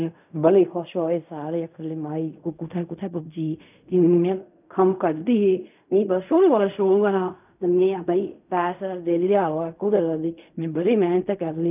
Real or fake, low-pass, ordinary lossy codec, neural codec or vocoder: fake; 3.6 kHz; none; codec, 16 kHz in and 24 kHz out, 0.4 kbps, LongCat-Audio-Codec, fine tuned four codebook decoder